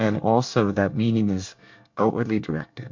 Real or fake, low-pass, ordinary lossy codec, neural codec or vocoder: fake; 7.2 kHz; MP3, 64 kbps; codec, 24 kHz, 1 kbps, SNAC